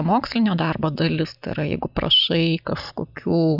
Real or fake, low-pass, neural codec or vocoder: real; 5.4 kHz; none